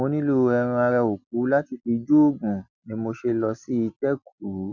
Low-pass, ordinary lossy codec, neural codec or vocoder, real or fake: 7.2 kHz; none; none; real